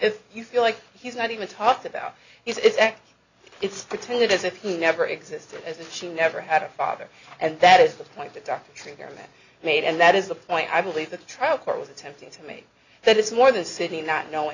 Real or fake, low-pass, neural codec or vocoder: real; 7.2 kHz; none